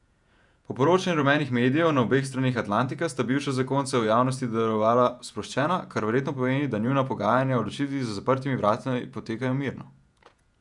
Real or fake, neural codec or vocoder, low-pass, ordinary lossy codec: fake; vocoder, 48 kHz, 128 mel bands, Vocos; 10.8 kHz; none